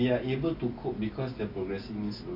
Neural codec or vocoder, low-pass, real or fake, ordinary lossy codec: none; 5.4 kHz; real; AAC, 32 kbps